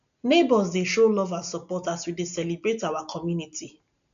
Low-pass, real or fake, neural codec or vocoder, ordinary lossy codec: 7.2 kHz; real; none; none